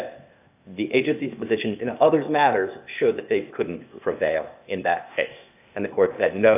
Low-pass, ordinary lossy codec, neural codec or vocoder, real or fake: 3.6 kHz; AAC, 32 kbps; codec, 16 kHz, 0.8 kbps, ZipCodec; fake